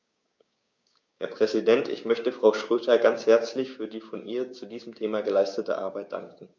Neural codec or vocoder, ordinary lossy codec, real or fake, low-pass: codec, 16 kHz, 6 kbps, DAC; none; fake; none